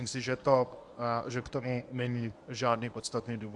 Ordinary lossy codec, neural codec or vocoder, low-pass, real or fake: AAC, 64 kbps; codec, 24 kHz, 0.9 kbps, WavTokenizer, medium speech release version 1; 10.8 kHz; fake